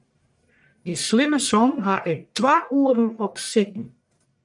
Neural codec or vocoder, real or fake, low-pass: codec, 44.1 kHz, 1.7 kbps, Pupu-Codec; fake; 10.8 kHz